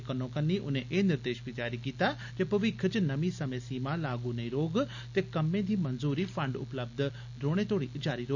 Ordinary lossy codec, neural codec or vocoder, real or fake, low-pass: none; none; real; 7.2 kHz